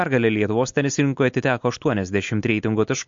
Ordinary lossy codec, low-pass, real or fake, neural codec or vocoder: MP3, 48 kbps; 7.2 kHz; real; none